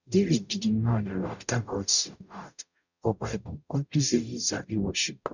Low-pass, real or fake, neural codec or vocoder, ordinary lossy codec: 7.2 kHz; fake; codec, 44.1 kHz, 0.9 kbps, DAC; none